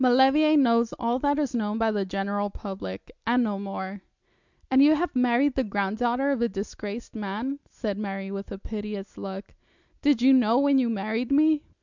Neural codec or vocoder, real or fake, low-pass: none; real; 7.2 kHz